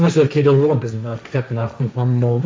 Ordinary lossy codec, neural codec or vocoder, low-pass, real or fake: none; codec, 16 kHz, 1.1 kbps, Voila-Tokenizer; none; fake